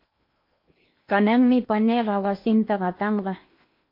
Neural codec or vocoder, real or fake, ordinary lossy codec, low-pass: codec, 16 kHz in and 24 kHz out, 0.8 kbps, FocalCodec, streaming, 65536 codes; fake; MP3, 32 kbps; 5.4 kHz